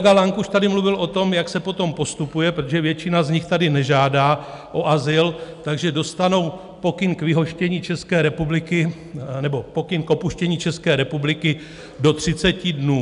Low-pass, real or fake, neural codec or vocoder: 10.8 kHz; real; none